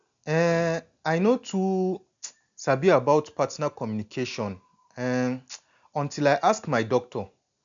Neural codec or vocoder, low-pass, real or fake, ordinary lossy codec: none; 7.2 kHz; real; none